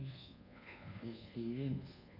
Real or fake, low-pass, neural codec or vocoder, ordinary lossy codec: fake; 5.4 kHz; codec, 24 kHz, 1.2 kbps, DualCodec; none